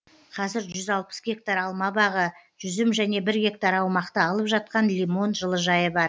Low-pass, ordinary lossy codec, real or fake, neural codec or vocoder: none; none; real; none